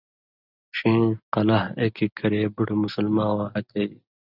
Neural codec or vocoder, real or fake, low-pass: none; real; 5.4 kHz